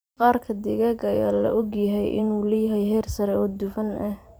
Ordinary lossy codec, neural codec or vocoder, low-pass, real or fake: none; vocoder, 44.1 kHz, 128 mel bands every 512 samples, BigVGAN v2; none; fake